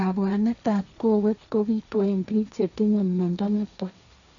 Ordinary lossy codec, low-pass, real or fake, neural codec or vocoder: none; 7.2 kHz; fake; codec, 16 kHz, 1.1 kbps, Voila-Tokenizer